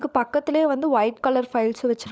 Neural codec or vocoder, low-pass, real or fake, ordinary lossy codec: codec, 16 kHz, 16 kbps, FunCodec, trained on LibriTTS, 50 frames a second; none; fake; none